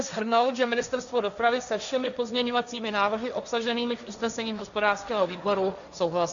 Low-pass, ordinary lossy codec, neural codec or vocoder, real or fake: 7.2 kHz; MP3, 96 kbps; codec, 16 kHz, 1.1 kbps, Voila-Tokenizer; fake